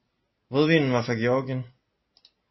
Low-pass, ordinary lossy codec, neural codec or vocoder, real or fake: 7.2 kHz; MP3, 24 kbps; none; real